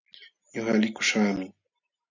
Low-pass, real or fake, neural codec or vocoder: 7.2 kHz; real; none